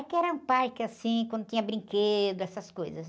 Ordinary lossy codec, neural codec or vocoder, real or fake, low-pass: none; none; real; none